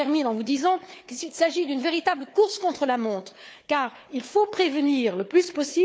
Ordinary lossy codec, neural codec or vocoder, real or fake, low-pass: none; codec, 16 kHz, 4 kbps, FunCodec, trained on Chinese and English, 50 frames a second; fake; none